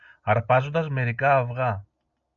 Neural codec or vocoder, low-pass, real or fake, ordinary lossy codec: none; 7.2 kHz; real; MP3, 64 kbps